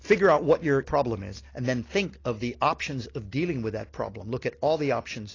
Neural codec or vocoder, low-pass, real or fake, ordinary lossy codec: none; 7.2 kHz; real; AAC, 32 kbps